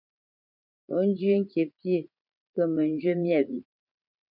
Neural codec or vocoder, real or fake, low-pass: vocoder, 44.1 kHz, 80 mel bands, Vocos; fake; 5.4 kHz